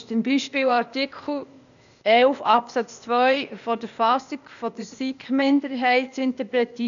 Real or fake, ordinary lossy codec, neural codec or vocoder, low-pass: fake; none; codec, 16 kHz, 0.8 kbps, ZipCodec; 7.2 kHz